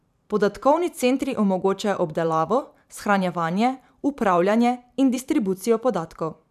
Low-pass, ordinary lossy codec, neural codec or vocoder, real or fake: 14.4 kHz; none; vocoder, 44.1 kHz, 128 mel bands every 512 samples, BigVGAN v2; fake